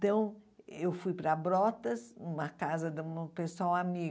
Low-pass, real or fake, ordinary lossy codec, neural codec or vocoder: none; real; none; none